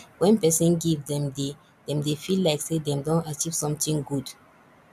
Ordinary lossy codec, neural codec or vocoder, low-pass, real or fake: none; none; 14.4 kHz; real